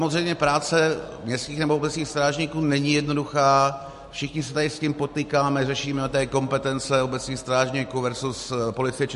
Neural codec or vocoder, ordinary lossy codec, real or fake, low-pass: none; MP3, 48 kbps; real; 14.4 kHz